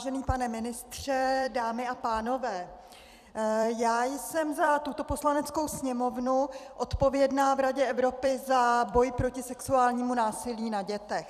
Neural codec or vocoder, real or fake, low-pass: vocoder, 44.1 kHz, 128 mel bands every 256 samples, BigVGAN v2; fake; 14.4 kHz